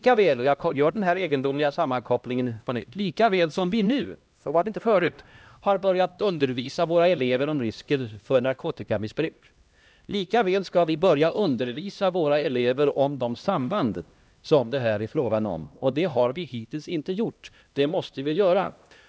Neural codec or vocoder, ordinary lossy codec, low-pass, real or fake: codec, 16 kHz, 1 kbps, X-Codec, HuBERT features, trained on LibriSpeech; none; none; fake